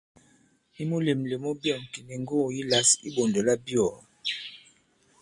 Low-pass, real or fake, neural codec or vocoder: 10.8 kHz; real; none